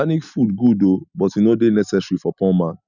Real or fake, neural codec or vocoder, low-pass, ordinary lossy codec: real; none; 7.2 kHz; none